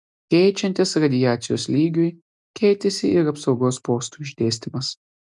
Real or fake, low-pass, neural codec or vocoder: real; 10.8 kHz; none